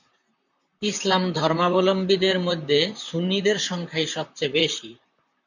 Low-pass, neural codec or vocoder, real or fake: 7.2 kHz; vocoder, 44.1 kHz, 128 mel bands, Pupu-Vocoder; fake